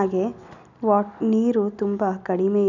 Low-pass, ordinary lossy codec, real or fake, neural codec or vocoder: 7.2 kHz; none; real; none